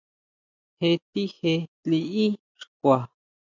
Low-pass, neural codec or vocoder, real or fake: 7.2 kHz; none; real